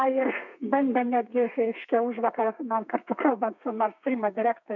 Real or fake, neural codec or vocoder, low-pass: fake; codec, 32 kHz, 1.9 kbps, SNAC; 7.2 kHz